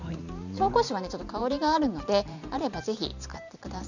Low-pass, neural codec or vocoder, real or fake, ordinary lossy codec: 7.2 kHz; vocoder, 44.1 kHz, 128 mel bands every 512 samples, BigVGAN v2; fake; none